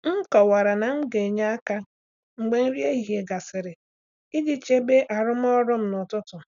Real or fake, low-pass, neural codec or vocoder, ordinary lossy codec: real; 7.2 kHz; none; none